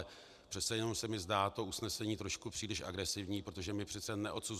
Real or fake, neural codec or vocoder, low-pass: real; none; 14.4 kHz